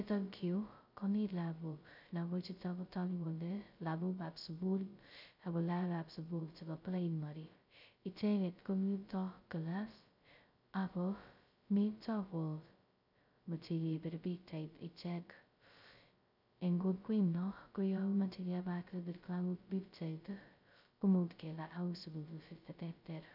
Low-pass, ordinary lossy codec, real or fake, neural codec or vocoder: 5.4 kHz; none; fake; codec, 16 kHz, 0.2 kbps, FocalCodec